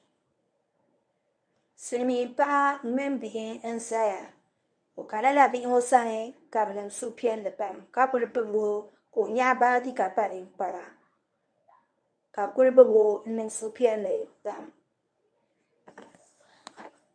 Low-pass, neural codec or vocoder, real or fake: 9.9 kHz; codec, 24 kHz, 0.9 kbps, WavTokenizer, medium speech release version 1; fake